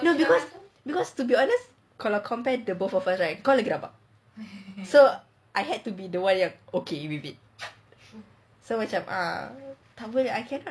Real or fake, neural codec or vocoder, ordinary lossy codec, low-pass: real; none; none; none